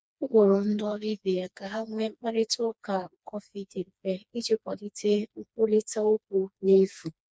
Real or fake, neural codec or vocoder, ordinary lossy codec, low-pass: fake; codec, 16 kHz, 2 kbps, FreqCodec, smaller model; none; none